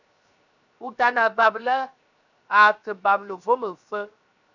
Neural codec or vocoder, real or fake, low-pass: codec, 16 kHz, 0.7 kbps, FocalCodec; fake; 7.2 kHz